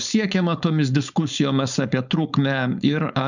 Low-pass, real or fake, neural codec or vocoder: 7.2 kHz; fake; codec, 16 kHz, 4.8 kbps, FACodec